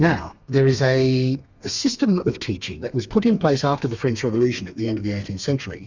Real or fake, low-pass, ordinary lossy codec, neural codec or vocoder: fake; 7.2 kHz; Opus, 64 kbps; codec, 32 kHz, 1.9 kbps, SNAC